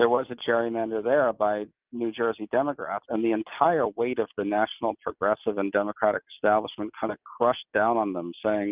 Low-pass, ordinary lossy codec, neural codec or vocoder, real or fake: 3.6 kHz; Opus, 32 kbps; none; real